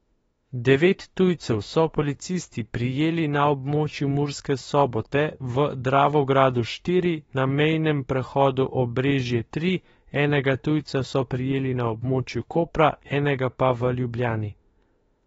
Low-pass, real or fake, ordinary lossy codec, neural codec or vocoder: 19.8 kHz; fake; AAC, 24 kbps; autoencoder, 48 kHz, 32 numbers a frame, DAC-VAE, trained on Japanese speech